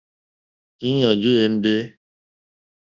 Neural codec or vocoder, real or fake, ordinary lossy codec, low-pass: codec, 24 kHz, 0.9 kbps, WavTokenizer, large speech release; fake; Opus, 64 kbps; 7.2 kHz